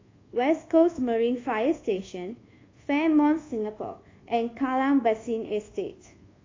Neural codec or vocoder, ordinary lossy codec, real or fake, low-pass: codec, 24 kHz, 1.2 kbps, DualCodec; AAC, 32 kbps; fake; 7.2 kHz